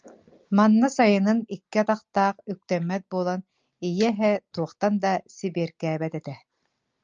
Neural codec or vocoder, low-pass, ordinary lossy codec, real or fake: none; 7.2 kHz; Opus, 24 kbps; real